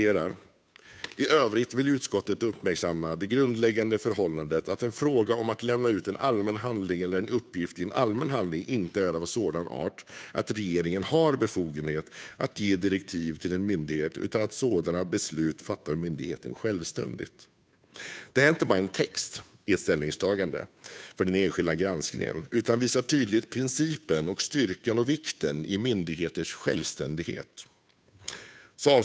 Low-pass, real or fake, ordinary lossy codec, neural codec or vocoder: none; fake; none; codec, 16 kHz, 2 kbps, FunCodec, trained on Chinese and English, 25 frames a second